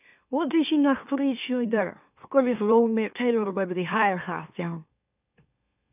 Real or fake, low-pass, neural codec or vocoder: fake; 3.6 kHz; autoencoder, 44.1 kHz, a latent of 192 numbers a frame, MeloTTS